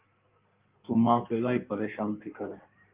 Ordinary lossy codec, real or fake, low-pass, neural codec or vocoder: Opus, 32 kbps; fake; 3.6 kHz; codec, 16 kHz in and 24 kHz out, 1.1 kbps, FireRedTTS-2 codec